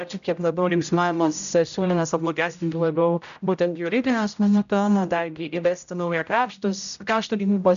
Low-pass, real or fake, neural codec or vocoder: 7.2 kHz; fake; codec, 16 kHz, 0.5 kbps, X-Codec, HuBERT features, trained on general audio